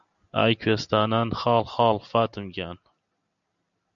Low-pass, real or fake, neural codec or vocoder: 7.2 kHz; real; none